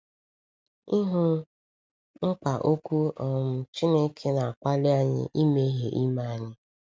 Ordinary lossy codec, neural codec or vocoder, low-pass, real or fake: none; none; none; real